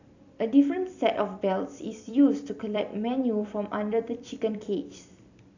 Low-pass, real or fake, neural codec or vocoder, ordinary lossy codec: 7.2 kHz; fake; vocoder, 44.1 kHz, 128 mel bands every 256 samples, BigVGAN v2; none